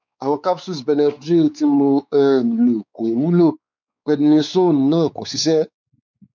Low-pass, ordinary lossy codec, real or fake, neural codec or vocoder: 7.2 kHz; none; fake; codec, 16 kHz, 4 kbps, X-Codec, WavLM features, trained on Multilingual LibriSpeech